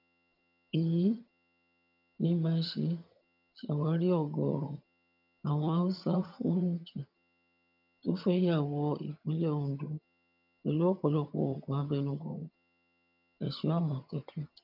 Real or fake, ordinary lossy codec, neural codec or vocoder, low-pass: fake; none; vocoder, 22.05 kHz, 80 mel bands, HiFi-GAN; 5.4 kHz